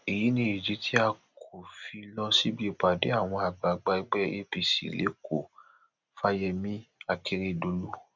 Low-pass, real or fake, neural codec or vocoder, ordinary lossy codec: 7.2 kHz; real; none; none